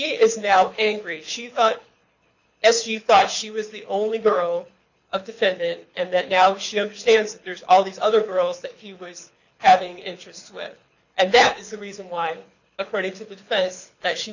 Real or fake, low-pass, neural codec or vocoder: fake; 7.2 kHz; codec, 24 kHz, 6 kbps, HILCodec